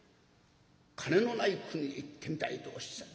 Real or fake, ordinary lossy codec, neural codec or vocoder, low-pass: real; none; none; none